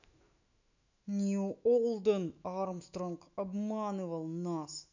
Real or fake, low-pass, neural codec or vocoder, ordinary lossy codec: fake; 7.2 kHz; autoencoder, 48 kHz, 128 numbers a frame, DAC-VAE, trained on Japanese speech; none